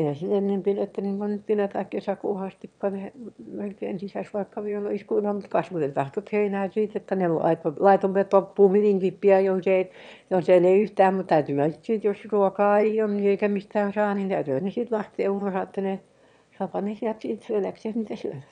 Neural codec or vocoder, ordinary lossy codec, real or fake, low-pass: autoencoder, 22.05 kHz, a latent of 192 numbers a frame, VITS, trained on one speaker; none; fake; 9.9 kHz